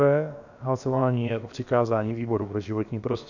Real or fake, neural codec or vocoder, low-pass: fake; codec, 16 kHz, about 1 kbps, DyCAST, with the encoder's durations; 7.2 kHz